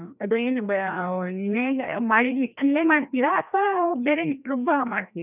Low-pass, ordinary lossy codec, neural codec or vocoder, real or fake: 3.6 kHz; none; codec, 16 kHz, 1 kbps, FreqCodec, larger model; fake